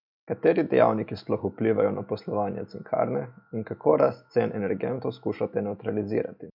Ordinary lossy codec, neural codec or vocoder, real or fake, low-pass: none; none; real; 5.4 kHz